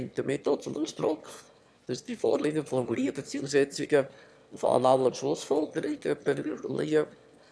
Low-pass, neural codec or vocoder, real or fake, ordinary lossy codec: none; autoencoder, 22.05 kHz, a latent of 192 numbers a frame, VITS, trained on one speaker; fake; none